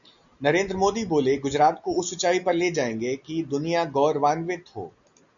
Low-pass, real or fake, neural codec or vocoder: 7.2 kHz; real; none